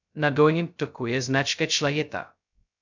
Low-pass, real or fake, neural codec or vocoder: 7.2 kHz; fake; codec, 16 kHz, 0.2 kbps, FocalCodec